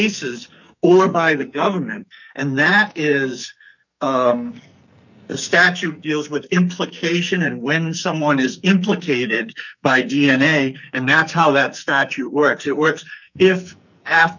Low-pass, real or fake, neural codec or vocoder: 7.2 kHz; fake; codec, 44.1 kHz, 2.6 kbps, SNAC